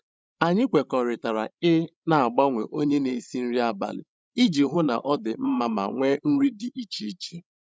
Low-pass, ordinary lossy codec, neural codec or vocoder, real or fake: none; none; codec, 16 kHz, 16 kbps, FreqCodec, larger model; fake